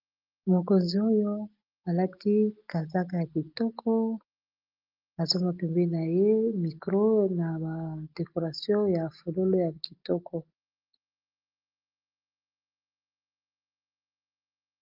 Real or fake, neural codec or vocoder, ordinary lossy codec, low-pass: real; none; Opus, 32 kbps; 5.4 kHz